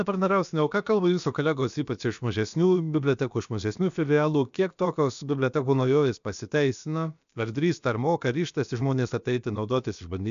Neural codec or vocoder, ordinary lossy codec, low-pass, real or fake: codec, 16 kHz, about 1 kbps, DyCAST, with the encoder's durations; AAC, 96 kbps; 7.2 kHz; fake